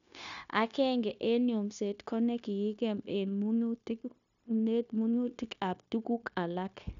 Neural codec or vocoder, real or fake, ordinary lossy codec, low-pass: codec, 16 kHz, 0.9 kbps, LongCat-Audio-Codec; fake; none; 7.2 kHz